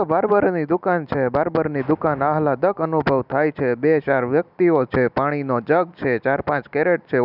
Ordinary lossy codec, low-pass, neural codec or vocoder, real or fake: none; 5.4 kHz; none; real